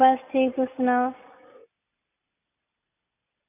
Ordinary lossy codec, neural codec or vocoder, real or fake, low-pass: none; none; real; 3.6 kHz